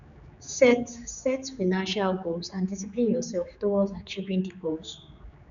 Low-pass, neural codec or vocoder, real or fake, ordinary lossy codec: 7.2 kHz; codec, 16 kHz, 4 kbps, X-Codec, HuBERT features, trained on general audio; fake; none